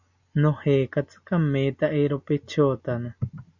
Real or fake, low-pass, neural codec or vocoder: real; 7.2 kHz; none